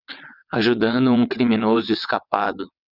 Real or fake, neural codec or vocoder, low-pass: fake; vocoder, 22.05 kHz, 80 mel bands, WaveNeXt; 5.4 kHz